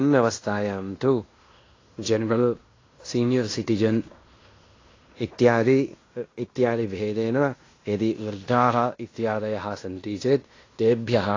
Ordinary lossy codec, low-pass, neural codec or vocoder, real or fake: AAC, 32 kbps; 7.2 kHz; codec, 16 kHz in and 24 kHz out, 0.9 kbps, LongCat-Audio-Codec, fine tuned four codebook decoder; fake